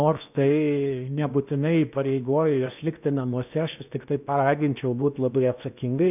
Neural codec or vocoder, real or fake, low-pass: codec, 16 kHz in and 24 kHz out, 0.8 kbps, FocalCodec, streaming, 65536 codes; fake; 3.6 kHz